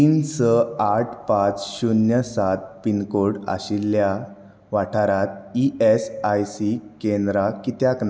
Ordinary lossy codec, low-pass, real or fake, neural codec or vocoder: none; none; real; none